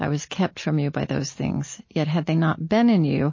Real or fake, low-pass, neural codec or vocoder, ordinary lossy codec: real; 7.2 kHz; none; MP3, 32 kbps